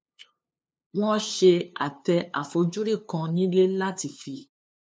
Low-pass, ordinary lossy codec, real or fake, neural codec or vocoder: none; none; fake; codec, 16 kHz, 2 kbps, FunCodec, trained on LibriTTS, 25 frames a second